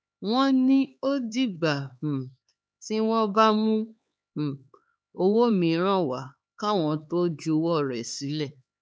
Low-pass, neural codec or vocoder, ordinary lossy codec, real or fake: none; codec, 16 kHz, 4 kbps, X-Codec, HuBERT features, trained on LibriSpeech; none; fake